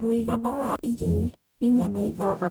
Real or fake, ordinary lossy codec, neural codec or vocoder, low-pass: fake; none; codec, 44.1 kHz, 0.9 kbps, DAC; none